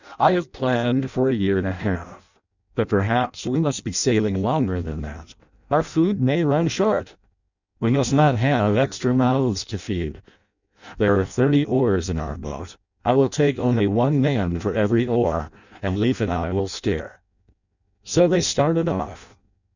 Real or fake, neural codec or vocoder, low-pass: fake; codec, 16 kHz in and 24 kHz out, 0.6 kbps, FireRedTTS-2 codec; 7.2 kHz